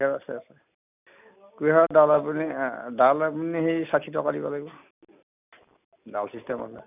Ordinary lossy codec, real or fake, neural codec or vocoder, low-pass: none; real; none; 3.6 kHz